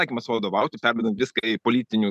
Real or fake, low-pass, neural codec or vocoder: real; 14.4 kHz; none